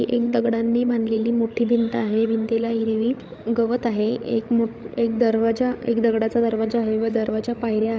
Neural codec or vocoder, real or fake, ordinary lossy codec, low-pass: codec, 16 kHz, 8 kbps, FreqCodec, larger model; fake; none; none